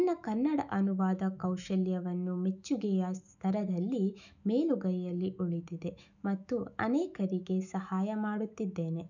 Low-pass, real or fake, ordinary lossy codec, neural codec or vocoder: 7.2 kHz; fake; none; autoencoder, 48 kHz, 128 numbers a frame, DAC-VAE, trained on Japanese speech